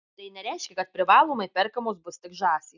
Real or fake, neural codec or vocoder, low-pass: real; none; 7.2 kHz